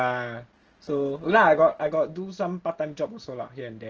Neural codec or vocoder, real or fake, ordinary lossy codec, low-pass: none; real; Opus, 16 kbps; 7.2 kHz